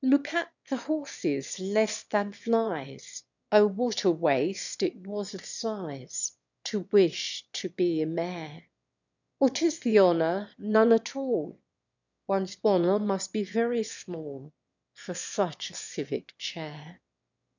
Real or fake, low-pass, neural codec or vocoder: fake; 7.2 kHz; autoencoder, 22.05 kHz, a latent of 192 numbers a frame, VITS, trained on one speaker